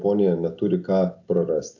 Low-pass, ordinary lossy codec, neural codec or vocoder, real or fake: 7.2 kHz; AAC, 48 kbps; none; real